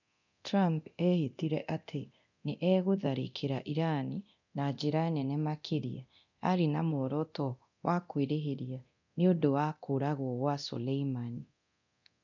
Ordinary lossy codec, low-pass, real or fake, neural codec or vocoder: none; 7.2 kHz; fake; codec, 24 kHz, 0.9 kbps, DualCodec